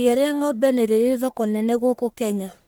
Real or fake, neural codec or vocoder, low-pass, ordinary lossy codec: fake; codec, 44.1 kHz, 1.7 kbps, Pupu-Codec; none; none